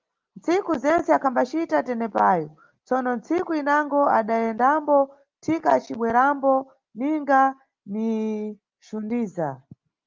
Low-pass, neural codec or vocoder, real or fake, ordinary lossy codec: 7.2 kHz; none; real; Opus, 32 kbps